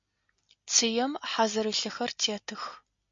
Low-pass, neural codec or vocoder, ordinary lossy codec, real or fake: 7.2 kHz; none; MP3, 48 kbps; real